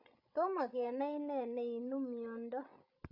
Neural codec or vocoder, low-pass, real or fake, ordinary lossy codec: codec, 16 kHz, 16 kbps, FreqCodec, larger model; 5.4 kHz; fake; none